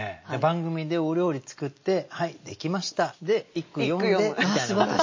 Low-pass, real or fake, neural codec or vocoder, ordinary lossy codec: 7.2 kHz; real; none; MP3, 32 kbps